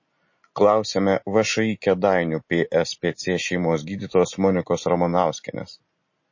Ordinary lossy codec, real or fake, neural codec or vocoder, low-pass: MP3, 32 kbps; real; none; 7.2 kHz